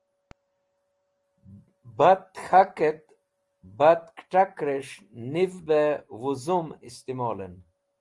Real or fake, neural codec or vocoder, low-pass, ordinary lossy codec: real; none; 10.8 kHz; Opus, 24 kbps